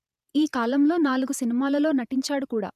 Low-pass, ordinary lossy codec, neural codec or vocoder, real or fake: 14.4 kHz; none; vocoder, 48 kHz, 128 mel bands, Vocos; fake